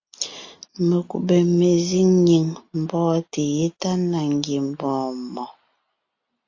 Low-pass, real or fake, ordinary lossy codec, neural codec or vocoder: 7.2 kHz; real; AAC, 48 kbps; none